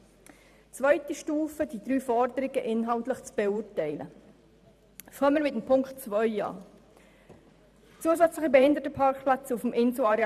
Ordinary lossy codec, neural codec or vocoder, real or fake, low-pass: none; vocoder, 44.1 kHz, 128 mel bands every 512 samples, BigVGAN v2; fake; 14.4 kHz